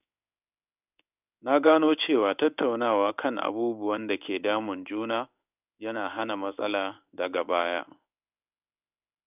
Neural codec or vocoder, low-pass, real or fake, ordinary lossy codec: codec, 16 kHz in and 24 kHz out, 1 kbps, XY-Tokenizer; 3.6 kHz; fake; none